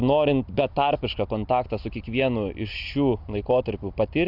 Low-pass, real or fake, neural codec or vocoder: 5.4 kHz; real; none